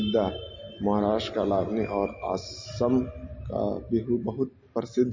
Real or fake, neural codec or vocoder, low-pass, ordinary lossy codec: real; none; 7.2 kHz; MP3, 32 kbps